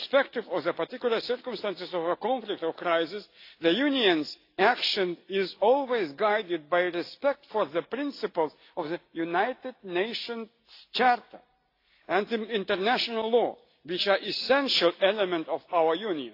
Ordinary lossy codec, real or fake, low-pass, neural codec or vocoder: AAC, 32 kbps; real; 5.4 kHz; none